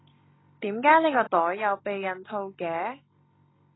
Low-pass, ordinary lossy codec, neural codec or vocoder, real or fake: 7.2 kHz; AAC, 16 kbps; none; real